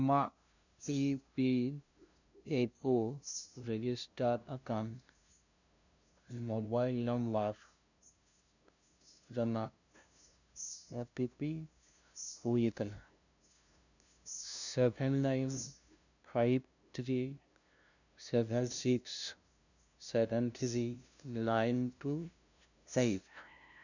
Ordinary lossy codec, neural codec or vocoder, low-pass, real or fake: none; codec, 16 kHz, 0.5 kbps, FunCodec, trained on LibriTTS, 25 frames a second; 7.2 kHz; fake